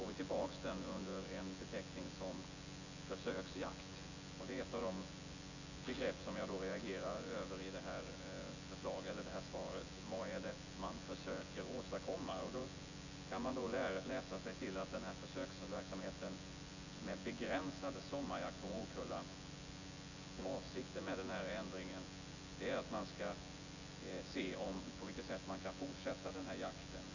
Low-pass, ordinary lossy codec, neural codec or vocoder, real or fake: 7.2 kHz; none; vocoder, 24 kHz, 100 mel bands, Vocos; fake